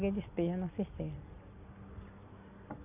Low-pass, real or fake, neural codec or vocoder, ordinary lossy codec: 3.6 kHz; real; none; none